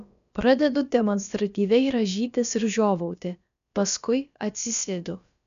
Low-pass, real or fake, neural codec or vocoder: 7.2 kHz; fake; codec, 16 kHz, about 1 kbps, DyCAST, with the encoder's durations